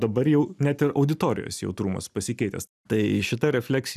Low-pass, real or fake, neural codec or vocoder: 14.4 kHz; real; none